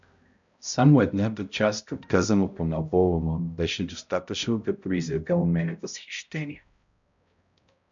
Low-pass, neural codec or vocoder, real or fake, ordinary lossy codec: 7.2 kHz; codec, 16 kHz, 0.5 kbps, X-Codec, HuBERT features, trained on balanced general audio; fake; MP3, 64 kbps